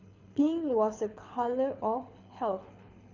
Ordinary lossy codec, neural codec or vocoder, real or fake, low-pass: none; codec, 24 kHz, 6 kbps, HILCodec; fake; 7.2 kHz